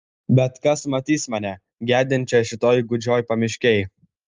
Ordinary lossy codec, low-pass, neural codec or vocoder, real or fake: Opus, 32 kbps; 7.2 kHz; none; real